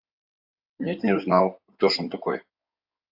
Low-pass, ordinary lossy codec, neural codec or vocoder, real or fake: 5.4 kHz; AAC, 48 kbps; codec, 16 kHz in and 24 kHz out, 2.2 kbps, FireRedTTS-2 codec; fake